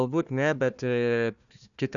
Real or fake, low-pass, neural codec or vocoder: fake; 7.2 kHz; codec, 16 kHz, 1 kbps, FunCodec, trained on Chinese and English, 50 frames a second